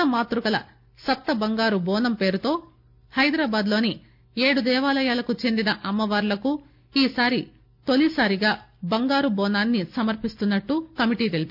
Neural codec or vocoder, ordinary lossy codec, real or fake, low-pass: none; none; real; 5.4 kHz